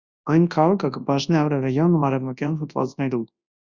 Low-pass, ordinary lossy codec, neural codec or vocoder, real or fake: 7.2 kHz; Opus, 64 kbps; codec, 24 kHz, 0.9 kbps, WavTokenizer, large speech release; fake